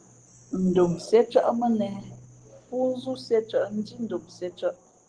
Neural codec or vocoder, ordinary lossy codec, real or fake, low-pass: none; Opus, 16 kbps; real; 9.9 kHz